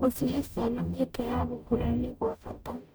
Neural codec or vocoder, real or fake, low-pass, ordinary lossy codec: codec, 44.1 kHz, 0.9 kbps, DAC; fake; none; none